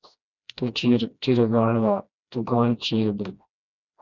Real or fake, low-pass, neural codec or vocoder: fake; 7.2 kHz; codec, 16 kHz, 1 kbps, FreqCodec, smaller model